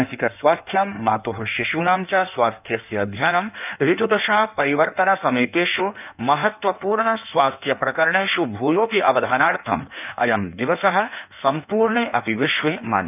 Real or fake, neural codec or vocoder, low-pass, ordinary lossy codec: fake; codec, 16 kHz in and 24 kHz out, 1.1 kbps, FireRedTTS-2 codec; 3.6 kHz; none